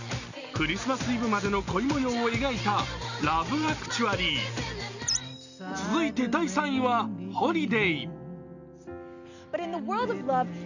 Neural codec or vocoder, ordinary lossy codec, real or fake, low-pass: none; none; real; 7.2 kHz